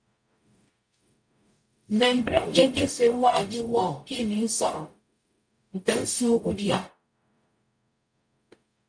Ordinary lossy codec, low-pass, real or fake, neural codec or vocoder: MP3, 64 kbps; 9.9 kHz; fake; codec, 44.1 kHz, 0.9 kbps, DAC